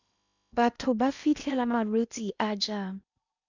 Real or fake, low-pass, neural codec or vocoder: fake; 7.2 kHz; codec, 16 kHz in and 24 kHz out, 0.8 kbps, FocalCodec, streaming, 65536 codes